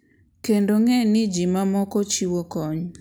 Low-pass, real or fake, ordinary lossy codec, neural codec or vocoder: none; real; none; none